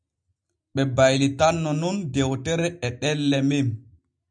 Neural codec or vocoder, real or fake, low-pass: none; real; 9.9 kHz